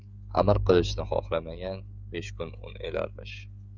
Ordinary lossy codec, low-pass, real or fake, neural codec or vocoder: Opus, 64 kbps; 7.2 kHz; fake; codec, 16 kHz, 16 kbps, FreqCodec, smaller model